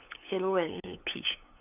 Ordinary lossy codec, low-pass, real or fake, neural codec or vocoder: none; 3.6 kHz; fake; codec, 16 kHz, 8 kbps, FunCodec, trained on LibriTTS, 25 frames a second